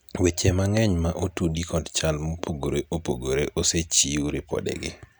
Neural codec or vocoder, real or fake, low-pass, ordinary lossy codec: none; real; none; none